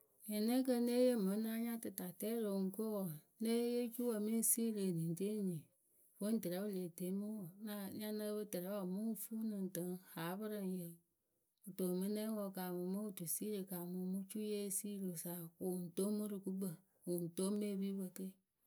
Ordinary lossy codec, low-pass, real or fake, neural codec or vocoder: none; none; real; none